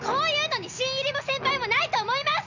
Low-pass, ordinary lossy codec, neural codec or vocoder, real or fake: 7.2 kHz; none; none; real